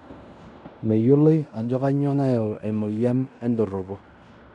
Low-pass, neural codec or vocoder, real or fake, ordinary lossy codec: 10.8 kHz; codec, 16 kHz in and 24 kHz out, 0.9 kbps, LongCat-Audio-Codec, fine tuned four codebook decoder; fake; none